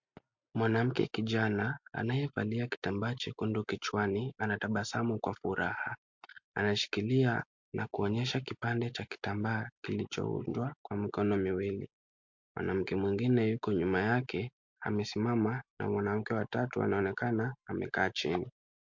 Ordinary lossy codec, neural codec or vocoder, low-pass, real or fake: MP3, 48 kbps; none; 7.2 kHz; real